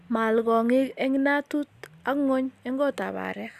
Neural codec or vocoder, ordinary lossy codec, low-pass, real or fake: none; Opus, 64 kbps; 14.4 kHz; real